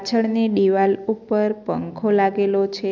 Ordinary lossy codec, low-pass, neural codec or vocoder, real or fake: none; 7.2 kHz; none; real